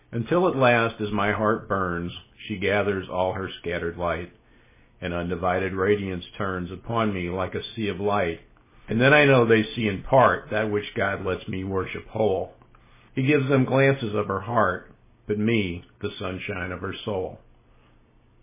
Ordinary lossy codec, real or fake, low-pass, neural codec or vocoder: MP3, 16 kbps; real; 3.6 kHz; none